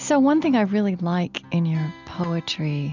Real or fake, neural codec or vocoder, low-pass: real; none; 7.2 kHz